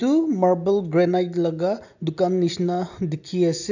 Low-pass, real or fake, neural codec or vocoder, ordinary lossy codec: 7.2 kHz; real; none; none